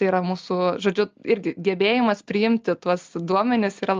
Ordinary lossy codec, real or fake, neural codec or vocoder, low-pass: Opus, 24 kbps; real; none; 7.2 kHz